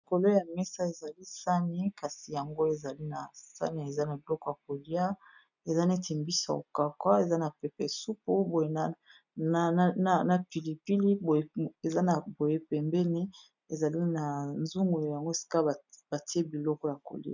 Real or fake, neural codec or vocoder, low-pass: real; none; 7.2 kHz